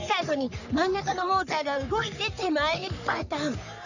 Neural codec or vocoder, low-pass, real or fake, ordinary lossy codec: codec, 44.1 kHz, 3.4 kbps, Pupu-Codec; 7.2 kHz; fake; none